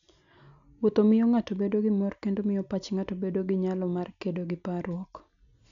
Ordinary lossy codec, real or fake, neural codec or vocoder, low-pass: none; real; none; 7.2 kHz